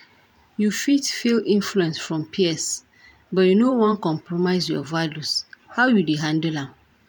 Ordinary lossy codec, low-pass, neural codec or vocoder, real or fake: none; 19.8 kHz; vocoder, 44.1 kHz, 128 mel bands every 512 samples, BigVGAN v2; fake